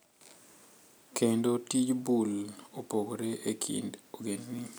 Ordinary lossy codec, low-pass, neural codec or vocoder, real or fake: none; none; none; real